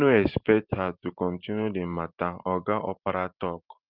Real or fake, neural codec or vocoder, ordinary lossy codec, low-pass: fake; vocoder, 44.1 kHz, 128 mel bands every 512 samples, BigVGAN v2; Opus, 32 kbps; 5.4 kHz